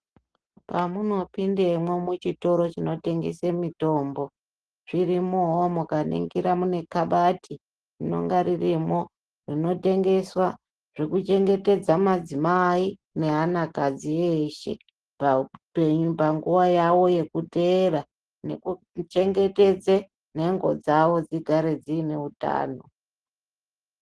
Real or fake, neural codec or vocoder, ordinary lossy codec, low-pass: real; none; Opus, 16 kbps; 10.8 kHz